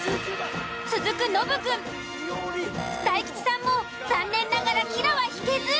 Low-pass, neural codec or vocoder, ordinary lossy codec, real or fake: none; none; none; real